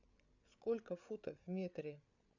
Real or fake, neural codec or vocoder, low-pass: fake; codec, 16 kHz, 8 kbps, FreqCodec, larger model; 7.2 kHz